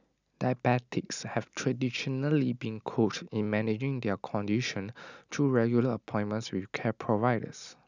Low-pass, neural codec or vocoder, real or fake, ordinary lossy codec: 7.2 kHz; none; real; none